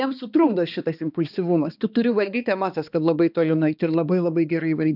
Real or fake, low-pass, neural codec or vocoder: fake; 5.4 kHz; codec, 16 kHz, 2 kbps, X-Codec, HuBERT features, trained on balanced general audio